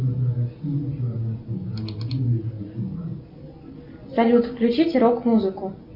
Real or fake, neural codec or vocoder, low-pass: real; none; 5.4 kHz